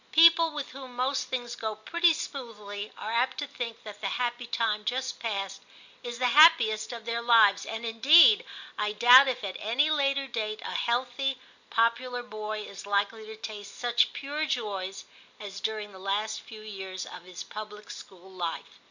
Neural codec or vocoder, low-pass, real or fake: none; 7.2 kHz; real